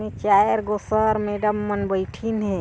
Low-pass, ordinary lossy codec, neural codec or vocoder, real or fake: none; none; none; real